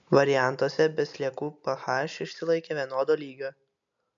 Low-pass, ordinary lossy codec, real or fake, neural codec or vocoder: 7.2 kHz; MP3, 64 kbps; real; none